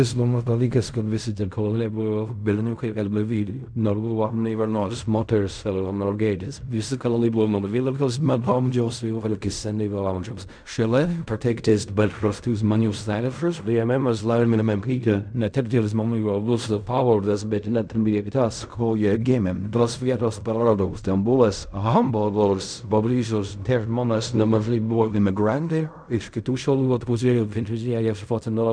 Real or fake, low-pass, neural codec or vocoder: fake; 9.9 kHz; codec, 16 kHz in and 24 kHz out, 0.4 kbps, LongCat-Audio-Codec, fine tuned four codebook decoder